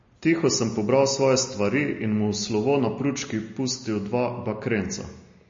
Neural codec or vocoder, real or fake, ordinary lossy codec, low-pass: none; real; MP3, 32 kbps; 7.2 kHz